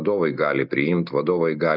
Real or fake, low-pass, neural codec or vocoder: real; 5.4 kHz; none